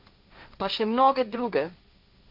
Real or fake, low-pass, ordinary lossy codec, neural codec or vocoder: fake; 5.4 kHz; MP3, 48 kbps; codec, 16 kHz, 1.1 kbps, Voila-Tokenizer